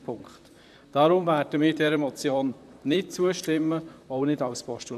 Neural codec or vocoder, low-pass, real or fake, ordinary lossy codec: vocoder, 44.1 kHz, 128 mel bands, Pupu-Vocoder; 14.4 kHz; fake; none